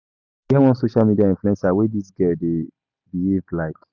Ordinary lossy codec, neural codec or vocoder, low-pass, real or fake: none; none; 7.2 kHz; real